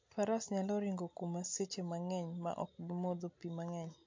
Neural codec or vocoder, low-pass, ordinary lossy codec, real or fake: none; 7.2 kHz; MP3, 48 kbps; real